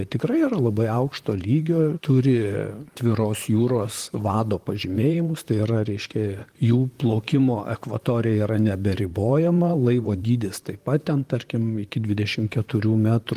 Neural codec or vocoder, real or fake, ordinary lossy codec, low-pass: vocoder, 44.1 kHz, 128 mel bands, Pupu-Vocoder; fake; Opus, 24 kbps; 14.4 kHz